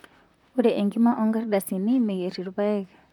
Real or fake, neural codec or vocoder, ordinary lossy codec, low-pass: fake; vocoder, 44.1 kHz, 128 mel bands, Pupu-Vocoder; none; 19.8 kHz